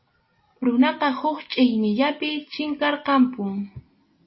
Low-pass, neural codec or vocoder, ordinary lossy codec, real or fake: 7.2 kHz; none; MP3, 24 kbps; real